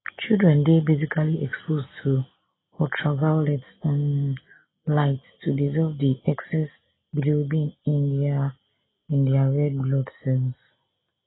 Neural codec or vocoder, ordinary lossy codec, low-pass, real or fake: none; AAC, 16 kbps; 7.2 kHz; real